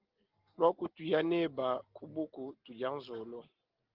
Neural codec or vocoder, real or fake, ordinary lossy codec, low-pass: none; real; Opus, 16 kbps; 5.4 kHz